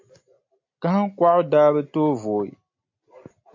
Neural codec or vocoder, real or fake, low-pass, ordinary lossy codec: none; real; 7.2 kHz; MP3, 48 kbps